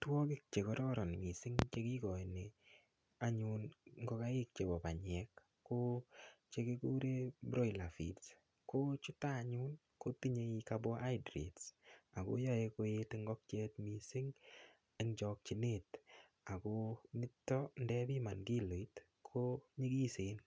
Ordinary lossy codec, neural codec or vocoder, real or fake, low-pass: none; none; real; none